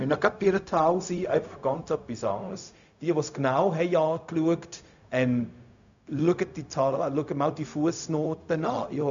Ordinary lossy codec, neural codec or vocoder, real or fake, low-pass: none; codec, 16 kHz, 0.4 kbps, LongCat-Audio-Codec; fake; 7.2 kHz